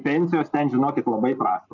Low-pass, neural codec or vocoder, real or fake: 7.2 kHz; none; real